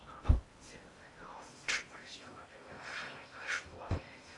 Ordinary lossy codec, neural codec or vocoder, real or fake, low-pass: AAC, 32 kbps; codec, 16 kHz in and 24 kHz out, 0.6 kbps, FocalCodec, streaming, 2048 codes; fake; 10.8 kHz